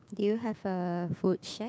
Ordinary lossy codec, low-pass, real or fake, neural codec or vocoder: none; none; fake; codec, 16 kHz, 6 kbps, DAC